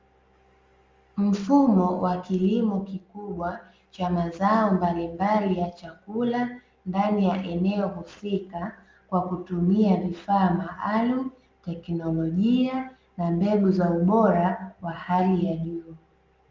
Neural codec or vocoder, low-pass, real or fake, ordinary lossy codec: none; 7.2 kHz; real; Opus, 32 kbps